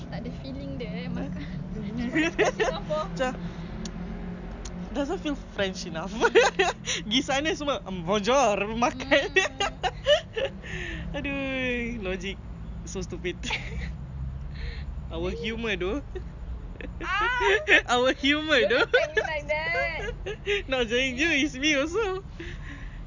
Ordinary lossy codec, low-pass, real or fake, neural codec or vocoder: none; 7.2 kHz; real; none